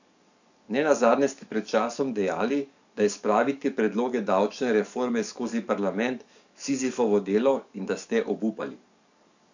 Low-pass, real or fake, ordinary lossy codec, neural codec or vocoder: 7.2 kHz; fake; none; codec, 44.1 kHz, 7.8 kbps, DAC